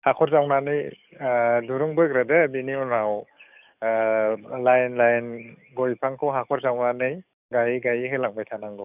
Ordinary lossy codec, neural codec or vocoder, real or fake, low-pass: none; codec, 44.1 kHz, 7.8 kbps, DAC; fake; 3.6 kHz